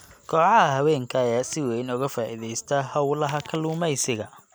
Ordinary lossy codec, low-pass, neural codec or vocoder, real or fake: none; none; none; real